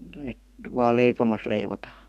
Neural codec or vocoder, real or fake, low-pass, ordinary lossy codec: codec, 32 kHz, 1.9 kbps, SNAC; fake; 14.4 kHz; none